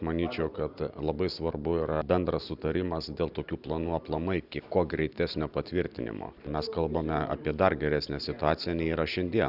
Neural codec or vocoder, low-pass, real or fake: none; 5.4 kHz; real